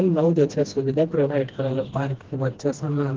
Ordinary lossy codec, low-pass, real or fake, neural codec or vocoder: Opus, 16 kbps; 7.2 kHz; fake; codec, 16 kHz, 1 kbps, FreqCodec, smaller model